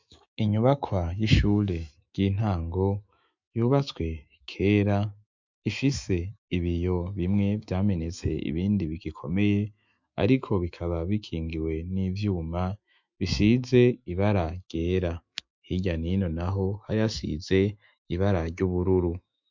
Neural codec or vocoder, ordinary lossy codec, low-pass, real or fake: autoencoder, 48 kHz, 128 numbers a frame, DAC-VAE, trained on Japanese speech; MP3, 64 kbps; 7.2 kHz; fake